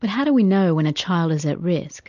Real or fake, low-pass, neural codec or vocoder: real; 7.2 kHz; none